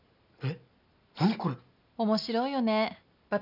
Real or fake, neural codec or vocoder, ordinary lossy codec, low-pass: real; none; none; 5.4 kHz